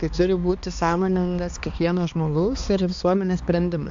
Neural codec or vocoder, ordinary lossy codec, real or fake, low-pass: codec, 16 kHz, 2 kbps, X-Codec, HuBERT features, trained on balanced general audio; AAC, 64 kbps; fake; 7.2 kHz